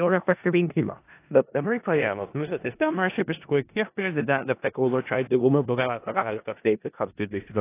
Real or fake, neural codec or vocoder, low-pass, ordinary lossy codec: fake; codec, 16 kHz in and 24 kHz out, 0.4 kbps, LongCat-Audio-Codec, four codebook decoder; 3.6 kHz; AAC, 24 kbps